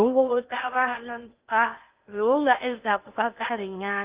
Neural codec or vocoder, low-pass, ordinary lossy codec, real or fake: codec, 16 kHz in and 24 kHz out, 0.6 kbps, FocalCodec, streaming, 2048 codes; 3.6 kHz; Opus, 24 kbps; fake